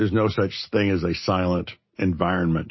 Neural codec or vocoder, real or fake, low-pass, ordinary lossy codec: none; real; 7.2 kHz; MP3, 24 kbps